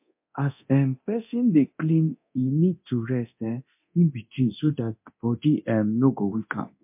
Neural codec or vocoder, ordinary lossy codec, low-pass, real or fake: codec, 24 kHz, 0.9 kbps, DualCodec; MP3, 32 kbps; 3.6 kHz; fake